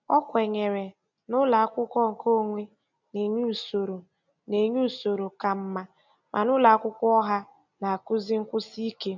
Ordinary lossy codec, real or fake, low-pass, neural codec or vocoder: none; real; 7.2 kHz; none